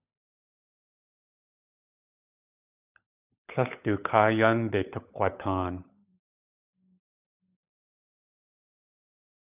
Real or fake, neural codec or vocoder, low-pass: fake; codec, 16 kHz, 16 kbps, FunCodec, trained on LibriTTS, 50 frames a second; 3.6 kHz